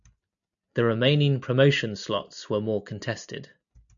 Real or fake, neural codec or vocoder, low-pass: real; none; 7.2 kHz